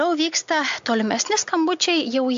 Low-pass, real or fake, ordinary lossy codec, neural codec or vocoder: 7.2 kHz; real; AAC, 64 kbps; none